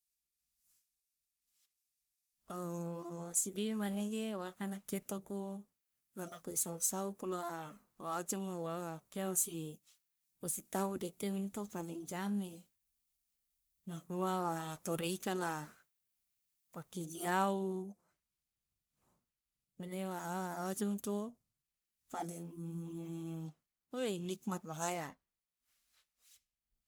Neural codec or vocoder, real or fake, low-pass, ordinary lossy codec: codec, 44.1 kHz, 1.7 kbps, Pupu-Codec; fake; none; none